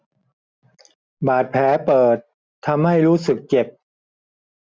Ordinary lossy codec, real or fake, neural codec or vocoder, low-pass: none; real; none; none